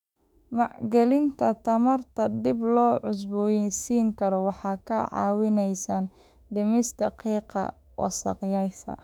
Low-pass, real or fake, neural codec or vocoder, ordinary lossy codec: 19.8 kHz; fake; autoencoder, 48 kHz, 32 numbers a frame, DAC-VAE, trained on Japanese speech; none